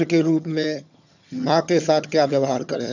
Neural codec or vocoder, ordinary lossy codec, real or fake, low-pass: vocoder, 22.05 kHz, 80 mel bands, HiFi-GAN; none; fake; 7.2 kHz